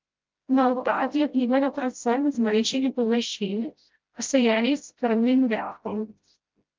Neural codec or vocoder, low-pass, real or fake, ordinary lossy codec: codec, 16 kHz, 0.5 kbps, FreqCodec, smaller model; 7.2 kHz; fake; Opus, 32 kbps